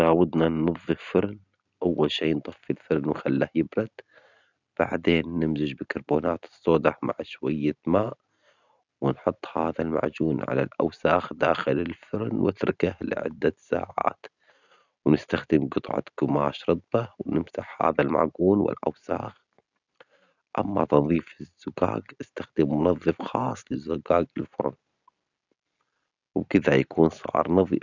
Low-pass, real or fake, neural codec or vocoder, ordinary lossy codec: 7.2 kHz; real; none; none